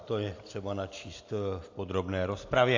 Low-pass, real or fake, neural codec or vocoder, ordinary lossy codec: 7.2 kHz; real; none; AAC, 48 kbps